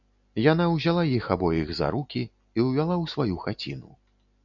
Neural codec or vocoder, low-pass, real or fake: none; 7.2 kHz; real